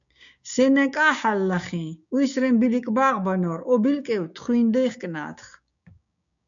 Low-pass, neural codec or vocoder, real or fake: 7.2 kHz; codec, 16 kHz, 6 kbps, DAC; fake